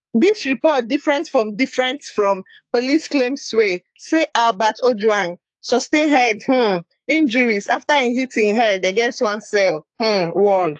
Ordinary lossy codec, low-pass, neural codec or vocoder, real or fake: AAC, 64 kbps; 10.8 kHz; codec, 44.1 kHz, 2.6 kbps, SNAC; fake